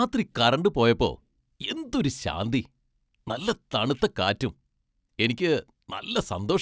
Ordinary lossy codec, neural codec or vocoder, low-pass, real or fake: none; none; none; real